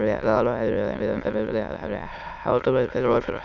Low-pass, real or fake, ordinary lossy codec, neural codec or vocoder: 7.2 kHz; fake; none; autoencoder, 22.05 kHz, a latent of 192 numbers a frame, VITS, trained on many speakers